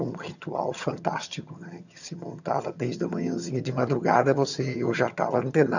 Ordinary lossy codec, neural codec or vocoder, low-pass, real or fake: none; vocoder, 22.05 kHz, 80 mel bands, HiFi-GAN; 7.2 kHz; fake